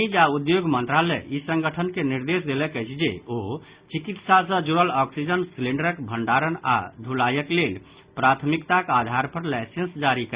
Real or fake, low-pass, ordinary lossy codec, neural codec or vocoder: real; 3.6 kHz; Opus, 64 kbps; none